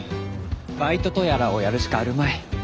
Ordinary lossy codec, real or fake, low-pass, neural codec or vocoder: none; real; none; none